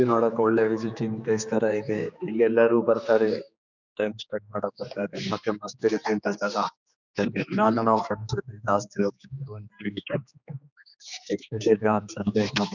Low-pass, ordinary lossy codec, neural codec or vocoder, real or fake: 7.2 kHz; none; codec, 16 kHz, 2 kbps, X-Codec, HuBERT features, trained on general audio; fake